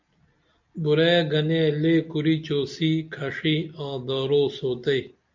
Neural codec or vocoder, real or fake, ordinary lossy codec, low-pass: none; real; MP3, 64 kbps; 7.2 kHz